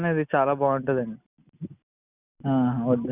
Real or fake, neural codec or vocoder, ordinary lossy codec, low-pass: real; none; none; 3.6 kHz